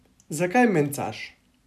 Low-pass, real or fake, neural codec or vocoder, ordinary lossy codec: 14.4 kHz; real; none; none